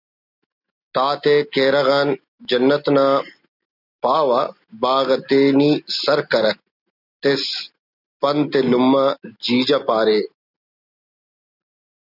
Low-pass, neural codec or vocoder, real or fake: 5.4 kHz; none; real